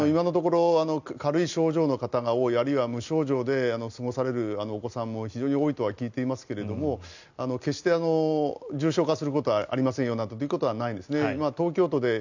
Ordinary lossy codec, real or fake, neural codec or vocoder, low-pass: none; real; none; 7.2 kHz